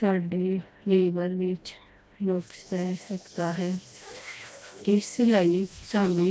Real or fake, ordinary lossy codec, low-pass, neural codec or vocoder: fake; none; none; codec, 16 kHz, 1 kbps, FreqCodec, smaller model